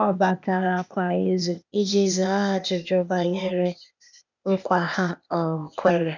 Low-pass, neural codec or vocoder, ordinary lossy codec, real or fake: 7.2 kHz; codec, 16 kHz, 0.8 kbps, ZipCodec; none; fake